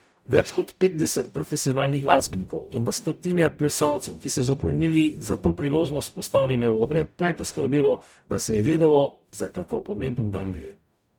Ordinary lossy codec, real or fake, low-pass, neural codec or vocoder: none; fake; none; codec, 44.1 kHz, 0.9 kbps, DAC